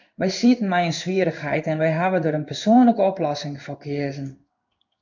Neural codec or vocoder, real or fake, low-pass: codec, 16 kHz in and 24 kHz out, 1 kbps, XY-Tokenizer; fake; 7.2 kHz